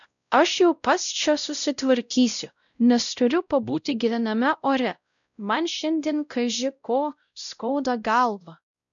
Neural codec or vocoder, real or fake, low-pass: codec, 16 kHz, 0.5 kbps, X-Codec, WavLM features, trained on Multilingual LibriSpeech; fake; 7.2 kHz